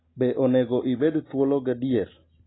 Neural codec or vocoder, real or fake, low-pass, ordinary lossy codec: none; real; 7.2 kHz; AAC, 16 kbps